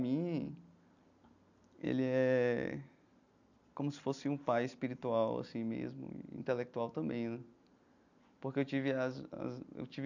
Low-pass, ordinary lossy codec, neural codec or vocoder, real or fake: 7.2 kHz; none; none; real